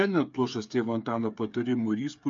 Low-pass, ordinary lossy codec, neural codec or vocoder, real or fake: 7.2 kHz; AAC, 64 kbps; codec, 16 kHz, 8 kbps, FreqCodec, smaller model; fake